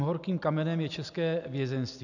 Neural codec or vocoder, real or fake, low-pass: none; real; 7.2 kHz